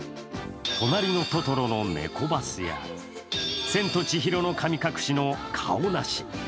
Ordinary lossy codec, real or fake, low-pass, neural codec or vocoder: none; real; none; none